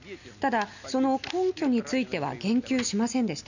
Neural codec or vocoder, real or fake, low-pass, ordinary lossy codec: none; real; 7.2 kHz; none